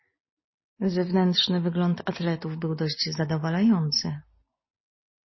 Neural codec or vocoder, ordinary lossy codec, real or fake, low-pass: none; MP3, 24 kbps; real; 7.2 kHz